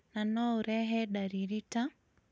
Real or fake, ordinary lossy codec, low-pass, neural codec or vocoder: real; none; none; none